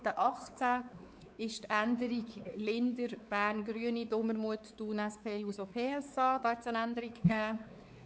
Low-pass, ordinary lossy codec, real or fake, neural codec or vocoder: none; none; fake; codec, 16 kHz, 4 kbps, X-Codec, WavLM features, trained on Multilingual LibriSpeech